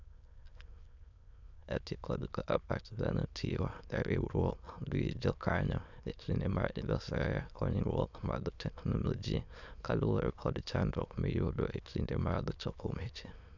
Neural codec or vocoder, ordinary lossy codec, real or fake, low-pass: autoencoder, 22.05 kHz, a latent of 192 numbers a frame, VITS, trained on many speakers; none; fake; 7.2 kHz